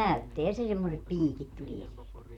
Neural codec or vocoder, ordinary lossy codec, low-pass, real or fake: vocoder, 44.1 kHz, 128 mel bands, Pupu-Vocoder; none; 19.8 kHz; fake